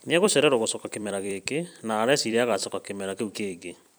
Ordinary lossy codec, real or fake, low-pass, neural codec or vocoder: none; fake; none; vocoder, 44.1 kHz, 128 mel bands every 256 samples, BigVGAN v2